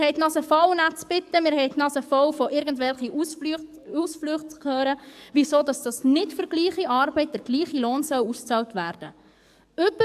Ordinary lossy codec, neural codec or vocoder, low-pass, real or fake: AAC, 96 kbps; codec, 44.1 kHz, 7.8 kbps, DAC; 14.4 kHz; fake